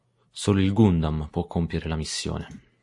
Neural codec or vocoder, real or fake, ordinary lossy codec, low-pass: none; real; AAC, 64 kbps; 10.8 kHz